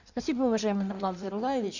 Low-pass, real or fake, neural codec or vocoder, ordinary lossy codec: 7.2 kHz; fake; codec, 16 kHz in and 24 kHz out, 1.1 kbps, FireRedTTS-2 codec; none